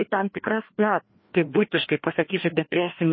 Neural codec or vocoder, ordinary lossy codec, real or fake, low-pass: codec, 16 kHz, 1 kbps, FreqCodec, larger model; MP3, 24 kbps; fake; 7.2 kHz